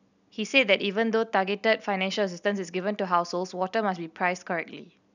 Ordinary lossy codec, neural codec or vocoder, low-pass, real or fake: none; none; 7.2 kHz; real